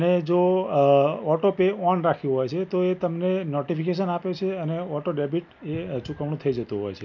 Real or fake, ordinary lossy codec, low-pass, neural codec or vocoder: real; none; 7.2 kHz; none